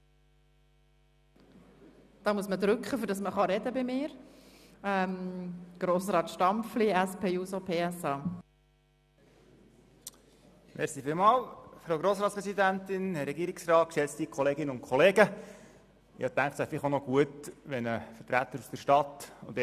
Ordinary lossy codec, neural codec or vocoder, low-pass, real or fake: none; none; 14.4 kHz; real